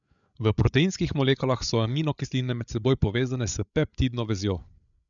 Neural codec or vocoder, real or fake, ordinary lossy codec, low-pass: codec, 16 kHz, 8 kbps, FreqCodec, larger model; fake; none; 7.2 kHz